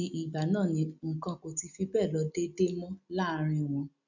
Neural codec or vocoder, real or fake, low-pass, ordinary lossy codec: none; real; 7.2 kHz; none